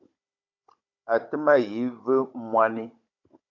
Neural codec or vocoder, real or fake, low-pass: codec, 16 kHz, 16 kbps, FunCodec, trained on Chinese and English, 50 frames a second; fake; 7.2 kHz